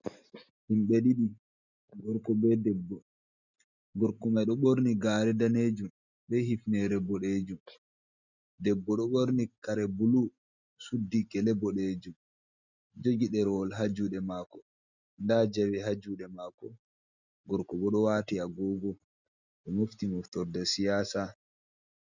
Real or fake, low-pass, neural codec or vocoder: real; 7.2 kHz; none